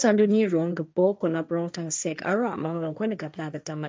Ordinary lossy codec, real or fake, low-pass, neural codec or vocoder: none; fake; none; codec, 16 kHz, 1.1 kbps, Voila-Tokenizer